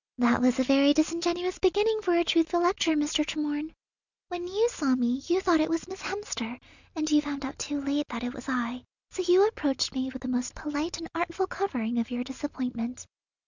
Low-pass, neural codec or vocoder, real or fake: 7.2 kHz; none; real